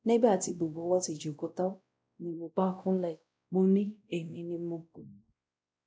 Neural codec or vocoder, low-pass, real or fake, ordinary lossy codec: codec, 16 kHz, 0.5 kbps, X-Codec, WavLM features, trained on Multilingual LibriSpeech; none; fake; none